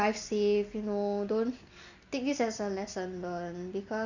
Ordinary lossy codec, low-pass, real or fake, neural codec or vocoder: none; 7.2 kHz; real; none